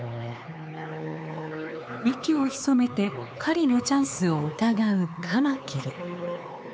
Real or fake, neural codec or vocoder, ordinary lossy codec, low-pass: fake; codec, 16 kHz, 4 kbps, X-Codec, HuBERT features, trained on LibriSpeech; none; none